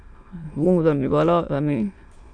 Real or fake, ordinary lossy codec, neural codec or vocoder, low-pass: fake; Opus, 64 kbps; autoencoder, 22.05 kHz, a latent of 192 numbers a frame, VITS, trained on many speakers; 9.9 kHz